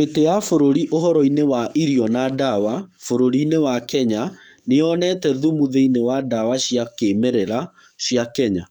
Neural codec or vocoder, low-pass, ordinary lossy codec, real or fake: codec, 44.1 kHz, 7.8 kbps, DAC; 19.8 kHz; none; fake